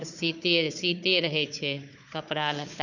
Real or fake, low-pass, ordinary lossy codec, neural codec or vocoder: fake; 7.2 kHz; none; codec, 16 kHz, 4 kbps, FunCodec, trained on Chinese and English, 50 frames a second